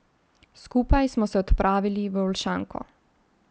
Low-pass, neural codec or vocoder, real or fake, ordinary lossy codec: none; none; real; none